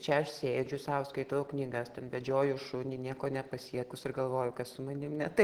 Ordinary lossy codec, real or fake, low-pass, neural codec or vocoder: Opus, 16 kbps; real; 19.8 kHz; none